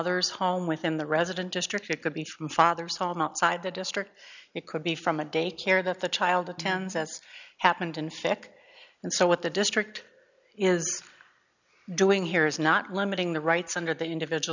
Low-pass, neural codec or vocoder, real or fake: 7.2 kHz; none; real